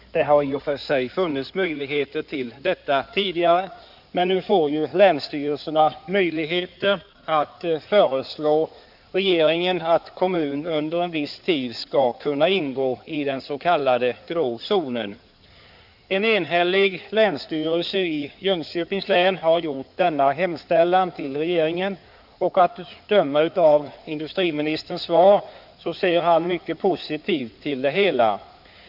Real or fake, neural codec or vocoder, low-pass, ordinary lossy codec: fake; codec, 16 kHz in and 24 kHz out, 2.2 kbps, FireRedTTS-2 codec; 5.4 kHz; none